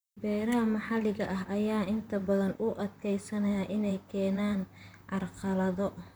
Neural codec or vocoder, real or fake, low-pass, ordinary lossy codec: vocoder, 44.1 kHz, 128 mel bands, Pupu-Vocoder; fake; none; none